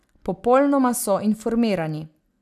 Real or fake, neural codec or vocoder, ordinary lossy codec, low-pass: real; none; none; 14.4 kHz